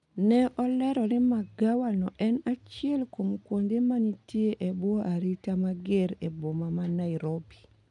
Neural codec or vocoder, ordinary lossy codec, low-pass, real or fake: none; none; 10.8 kHz; real